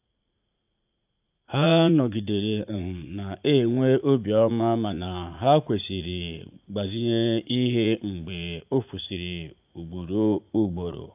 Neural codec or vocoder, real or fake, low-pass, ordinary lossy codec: vocoder, 44.1 kHz, 80 mel bands, Vocos; fake; 3.6 kHz; AAC, 32 kbps